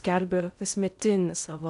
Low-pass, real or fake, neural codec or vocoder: 10.8 kHz; fake; codec, 16 kHz in and 24 kHz out, 0.8 kbps, FocalCodec, streaming, 65536 codes